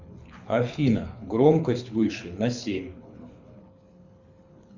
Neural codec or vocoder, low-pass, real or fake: codec, 24 kHz, 6 kbps, HILCodec; 7.2 kHz; fake